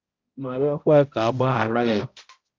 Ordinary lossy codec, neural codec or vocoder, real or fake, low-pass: Opus, 24 kbps; codec, 16 kHz, 0.5 kbps, X-Codec, HuBERT features, trained on balanced general audio; fake; 7.2 kHz